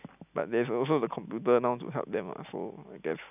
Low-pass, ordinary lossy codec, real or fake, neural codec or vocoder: 3.6 kHz; none; real; none